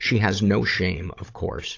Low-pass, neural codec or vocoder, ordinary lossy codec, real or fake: 7.2 kHz; codec, 16 kHz, 16 kbps, FunCodec, trained on Chinese and English, 50 frames a second; AAC, 48 kbps; fake